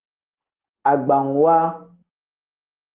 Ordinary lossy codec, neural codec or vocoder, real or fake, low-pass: Opus, 16 kbps; none; real; 3.6 kHz